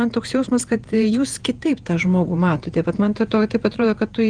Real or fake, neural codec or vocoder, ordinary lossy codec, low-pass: fake; vocoder, 48 kHz, 128 mel bands, Vocos; Opus, 24 kbps; 9.9 kHz